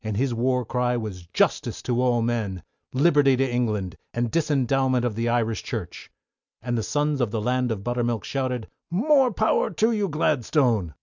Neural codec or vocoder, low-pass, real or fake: none; 7.2 kHz; real